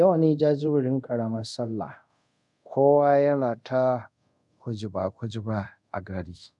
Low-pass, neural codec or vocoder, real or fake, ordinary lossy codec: 10.8 kHz; codec, 24 kHz, 0.5 kbps, DualCodec; fake; MP3, 96 kbps